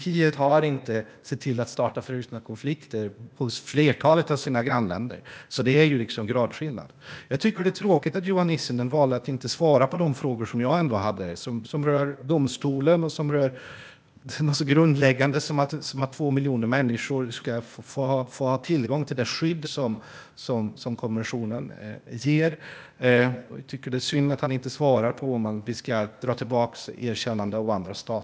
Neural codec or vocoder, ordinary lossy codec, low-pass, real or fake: codec, 16 kHz, 0.8 kbps, ZipCodec; none; none; fake